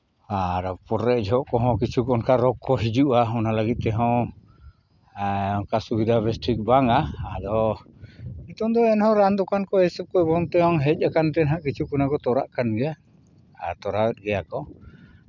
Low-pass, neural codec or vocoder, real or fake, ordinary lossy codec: 7.2 kHz; none; real; none